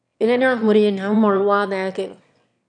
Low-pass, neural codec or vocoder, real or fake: 9.9 kHz; autoencoder, 22.05 kHz, a latent of 192 numbers a frame, VITS, trained on one speaker; fake